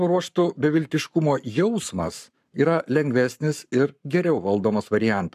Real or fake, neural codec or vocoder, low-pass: fake; codec, 44.1 kHz, 7.8 kbps, Pupu-Codec; 14.4 kHz